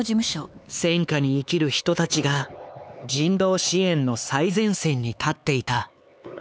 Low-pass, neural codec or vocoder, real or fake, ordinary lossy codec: none; codec, 16 kHz, 4 kbps, X-Codec, HuBERT features, trained on LibriSpeech; fake; none